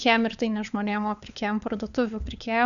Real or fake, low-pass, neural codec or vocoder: fake; 7.2 kHz; codec, 16 kHz, 4 kbps, FunCodec, trained on LibriTTS, 50 frames a second